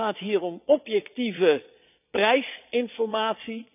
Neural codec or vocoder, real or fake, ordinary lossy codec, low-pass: vocoder, 22.05 kHz, 80 mel bands, Vocos; fake; none; 3.6 kHz